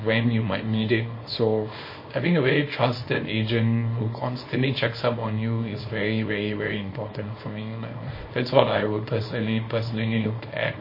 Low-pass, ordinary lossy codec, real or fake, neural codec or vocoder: 5.4 kHz; MP3, 24 kbps; fake; codec, 24 kHz, 0.9 kbps, WavTokenizer, small release